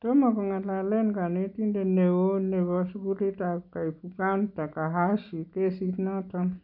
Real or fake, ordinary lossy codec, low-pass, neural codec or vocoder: real; none; 5.4 kHz; none